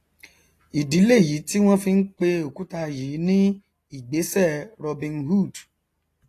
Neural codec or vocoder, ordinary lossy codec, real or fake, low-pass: none; AAC, 48 kbps; real; 14.4 kHz